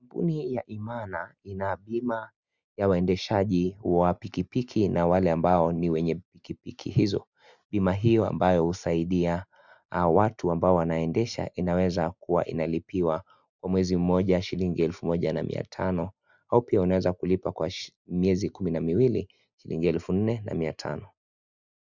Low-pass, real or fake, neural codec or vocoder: 7.2 kHz; real; none